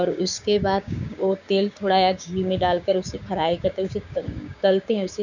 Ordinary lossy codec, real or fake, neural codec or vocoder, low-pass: none; fake; codec, 16 kHz, 6 kbps, DAC; 7.2 kHz